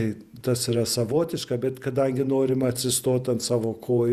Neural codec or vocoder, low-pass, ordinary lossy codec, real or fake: vocoder, 48 kHz, 128 mel bands, Vocos; 14.4 kHz; Opus, 64 kbps; fake